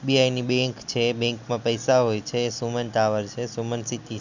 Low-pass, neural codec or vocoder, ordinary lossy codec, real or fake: 7.2 kHz; none; none; real